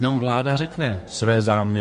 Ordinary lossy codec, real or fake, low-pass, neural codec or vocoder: MP3, 48 kbps; fake; 10.8 kHz; codec, 24 kHz, 1 kbps, SNAC